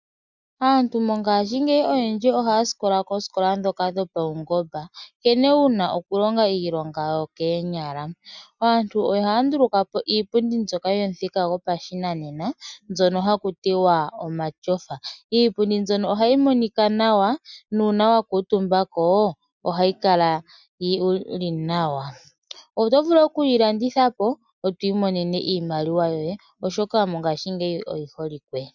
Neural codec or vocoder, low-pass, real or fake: none; 7.2 kHz; real